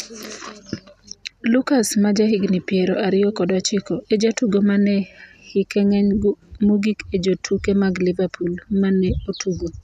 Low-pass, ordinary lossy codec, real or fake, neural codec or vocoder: 14.4 kHz; none; real; none